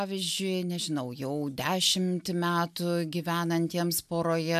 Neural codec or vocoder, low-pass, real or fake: none; 14.4 kHz; real